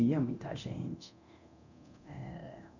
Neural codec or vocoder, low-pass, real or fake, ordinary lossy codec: codec, 24 kHz, 0.9 kbps, DualCodec; 7.2 kHz; fake; none